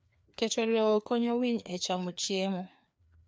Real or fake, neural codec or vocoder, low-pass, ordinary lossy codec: fake; codec, 16 kHz, 2 kbps, FreqCodec, larger model; none; none